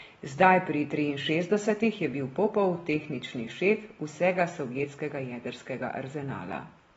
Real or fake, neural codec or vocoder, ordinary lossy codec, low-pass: real; none; AAC, 24 kbps; 19.8 kHz